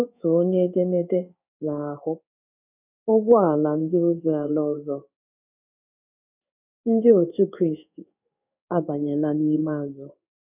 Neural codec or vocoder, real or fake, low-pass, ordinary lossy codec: codec, 16 kHz in and 24 kHz out, 1 kbps, XY-Tokenizer; fake; 3.6 kHz; none